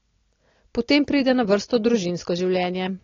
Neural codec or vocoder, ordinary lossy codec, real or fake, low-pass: none; AAC, 32 kbps; real; 7.2 kHz